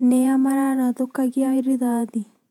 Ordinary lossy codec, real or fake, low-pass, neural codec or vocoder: none; fake; 19.8 kHz; vocoder, 48 kHz, 128 mel bands, Vocos